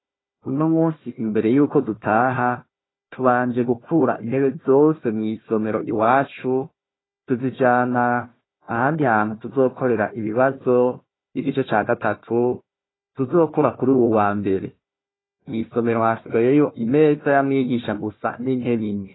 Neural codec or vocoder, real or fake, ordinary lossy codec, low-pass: codec, 16 kHz, 1 kbps, FunCodec, trained on Chinese and English, 50 frames a second; fake; AAC, 16 kbps; 7.2 kHz